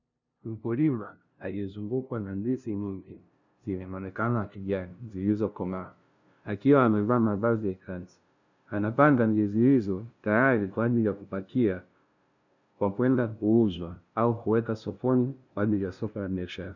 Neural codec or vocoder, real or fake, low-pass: codec, 16 kHz, 0.5 kbps, FunCodec, trained on LibriTTS, 25 frames a second; fake; 7.2 kHz